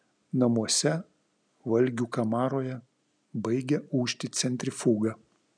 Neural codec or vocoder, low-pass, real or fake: none; 9.9 kHz; real